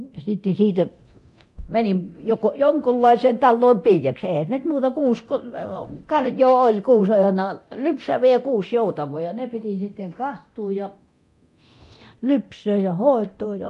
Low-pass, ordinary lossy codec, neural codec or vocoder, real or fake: 10.8 kHz; AAC, 64 kbps; codec, 24 kHz, 0.9 kbps, DualCodec; fake